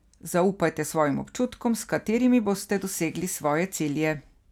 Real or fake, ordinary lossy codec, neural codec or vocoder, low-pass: real; none; none; 19.8 kHz